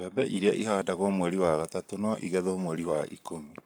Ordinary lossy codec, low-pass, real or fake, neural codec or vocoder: none; none; fake; codec, 44.1 kHz, 7.8 kbps, Pupu-Codec